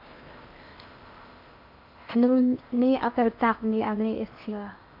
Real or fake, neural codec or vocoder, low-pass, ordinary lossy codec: fake; codec, 16 kHz in and 24 kHz out, 0.6 kbps, FocalCodec, streaming, 4096 codes; 5.4 kHz; MP3, 48 kbps